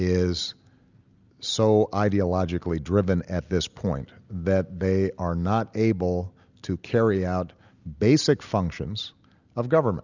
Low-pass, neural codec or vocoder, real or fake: 7.2 kHz; none; real